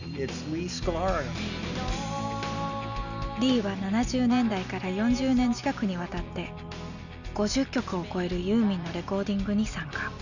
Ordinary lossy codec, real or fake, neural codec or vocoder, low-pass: none; real; none; 7.2 kHz